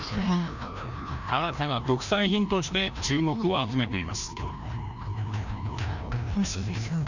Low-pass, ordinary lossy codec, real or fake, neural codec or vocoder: 7.2 kHz; none; fake; codec, 16 kHz, 1 kbps, FreqCodec, larger model